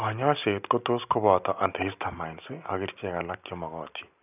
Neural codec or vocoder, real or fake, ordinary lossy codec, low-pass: none; real; none; 3.6 kHz